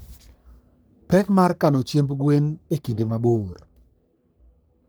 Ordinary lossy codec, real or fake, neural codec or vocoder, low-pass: none; fake; codec, 44.1 kHz, 3.4 kbps, Pupu-Codec; none